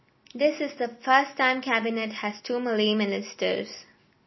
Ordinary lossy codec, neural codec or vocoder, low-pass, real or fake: MP3, 24 kbps; none; 7.2 kHz; real